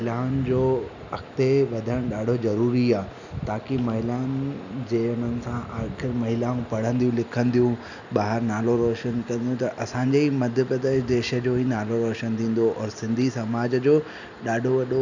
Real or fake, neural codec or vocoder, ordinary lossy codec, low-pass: real; none; none; 7.2 kHz